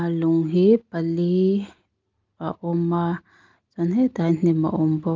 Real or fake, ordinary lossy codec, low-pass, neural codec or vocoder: real; Opus, 32 kbps; 7.2 kHz; none